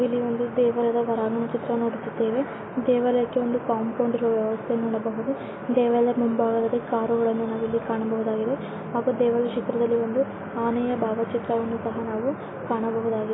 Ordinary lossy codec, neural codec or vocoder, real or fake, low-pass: AAC, 16 kbps; none; real; 7.2 kHz